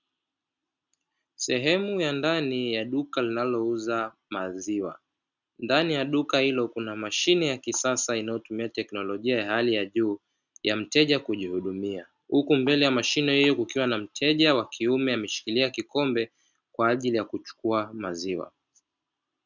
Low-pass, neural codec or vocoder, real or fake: 7.2 kHz; none; real